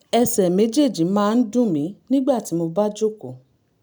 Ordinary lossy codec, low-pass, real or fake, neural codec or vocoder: none; none; real; none